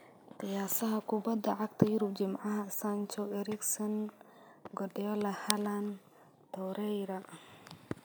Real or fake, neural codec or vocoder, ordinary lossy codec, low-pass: real; none; none; none